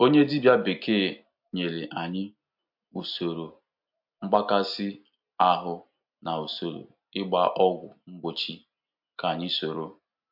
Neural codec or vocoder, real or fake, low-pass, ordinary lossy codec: none; real; 5.4 kHz; MP3, 48 kbps